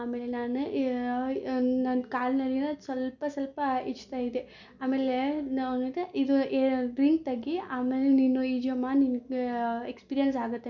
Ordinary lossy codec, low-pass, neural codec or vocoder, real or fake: none; 7.2 kHz; none; real